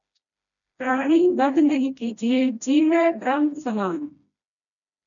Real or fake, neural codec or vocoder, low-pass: fake; codec, 16 kHz, 1 kbps, FreqCodec, smaller model; 7.2 kHz